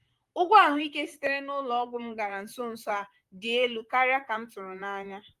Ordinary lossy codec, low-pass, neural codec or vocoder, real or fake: Opus, 32 kbps; 14.4 kHz; vocoder, 44.1 kHz, 128 mel bands, Pupu-Vocoder; fake